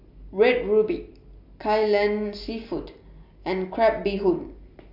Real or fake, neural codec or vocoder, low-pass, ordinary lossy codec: real; none; 5.4 kHz; MP3, 48 kbps